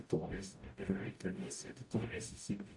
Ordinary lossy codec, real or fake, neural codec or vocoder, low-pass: AAC, 64 kbps; fake; codec, 44.1 kHz, 0.9 kbps, DAC; 10.8 kHz